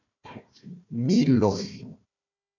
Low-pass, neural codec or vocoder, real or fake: 7.2 kHz; codec, 16 kHz, 1 kbps, FunCodec, trained on Chinese and English, 50 frames a second; fake